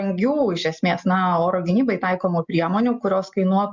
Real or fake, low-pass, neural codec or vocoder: real; 7.2 kHz; none